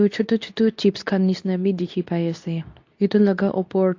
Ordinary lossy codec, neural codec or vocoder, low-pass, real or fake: AAC, 48 kbps; codec, 24 kHz, 0.9 kbps, WavTokenizer, medium speech release version 2; 7.2 kHz; fake